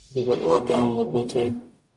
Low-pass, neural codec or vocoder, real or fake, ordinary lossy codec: 10.8 kHz; codec, 44.1 kHz, 0.9 kbps, DAC; fake; MP3, 48 kbps